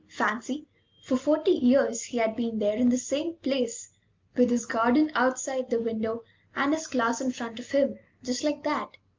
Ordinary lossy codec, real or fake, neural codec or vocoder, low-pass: Opus, 24 kbps; real; none; 7.2 kHz